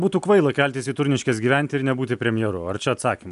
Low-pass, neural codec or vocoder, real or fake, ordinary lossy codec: 10.8 kHz; none; real; AAC, 96 kbps